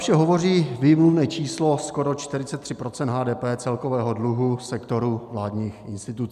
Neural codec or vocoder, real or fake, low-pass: none; real; 14.4 kHz